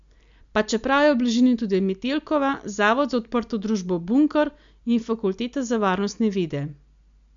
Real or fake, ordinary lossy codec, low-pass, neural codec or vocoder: real; MP3, 64 kbps; 7.2 kHz; none